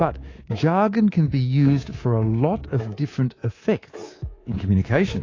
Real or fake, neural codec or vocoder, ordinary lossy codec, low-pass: fake; codec, 24 kHz, 3.1 kbps, DualCodec; AAC, 32 kbps; 7.2 kHz